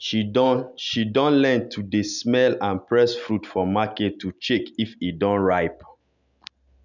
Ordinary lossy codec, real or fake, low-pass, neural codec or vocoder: none; real; 7.2 kHz; none